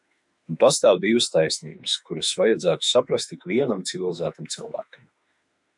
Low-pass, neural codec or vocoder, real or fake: 10.8 kHz; autoencoder, 48 kHz, 32 numbers a frame, DAC-VAE, trained on Japanese speech; fake